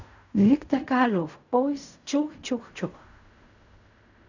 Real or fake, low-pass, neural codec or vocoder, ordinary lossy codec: fake; 7.2 kHz; codec, 16 kHz in and 24 kHz out, 0.4 kbps, LongCat-Audio-Codec, fine tuned four codebook decoder; none